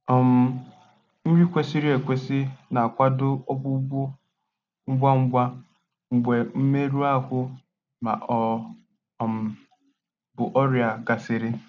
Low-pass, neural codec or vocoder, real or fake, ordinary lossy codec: 7.2 kHz; none; real; none